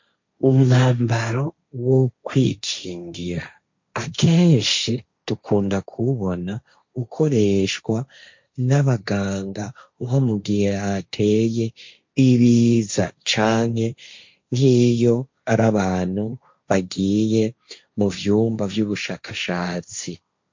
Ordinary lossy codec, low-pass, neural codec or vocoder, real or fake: MP3, 48 kbps; 7.2 kHz; codec, 16 kHz, 1.1 kbps, Voila-Tokenizer; fake